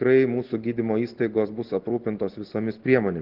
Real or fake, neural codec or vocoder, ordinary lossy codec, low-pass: real; none; Opus, 16 kbps; 5.4 kHz